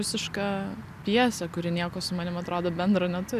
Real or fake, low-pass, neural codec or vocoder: real; 14.4 kHz; none